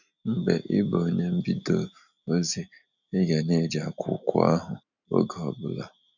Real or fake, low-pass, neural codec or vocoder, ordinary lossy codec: real; 7.2 kHz; none; none